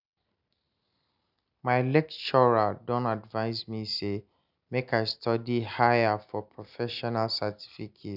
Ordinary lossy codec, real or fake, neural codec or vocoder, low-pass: none; real; none; 5.4 kHz